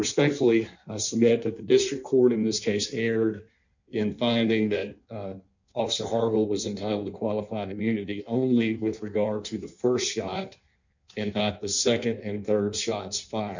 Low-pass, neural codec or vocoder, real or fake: 7.2 kHz; codec, 16 kHz in and 24 kHz out, 1.1 kbps, FireRedTTS-2 codec; fake